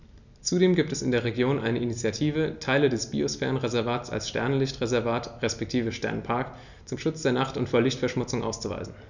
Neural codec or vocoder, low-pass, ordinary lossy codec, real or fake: none; 7.2 kHz; none; real